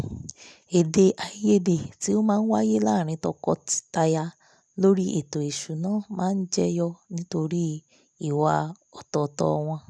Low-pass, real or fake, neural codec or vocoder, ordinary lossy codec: none; real; none; none